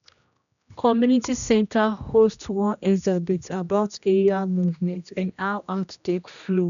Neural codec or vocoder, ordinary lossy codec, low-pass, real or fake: codec, 16 kHz, 1 kbps, X-Codec, HuBERT features, trained on general audio; none; 7.2 kHz; fake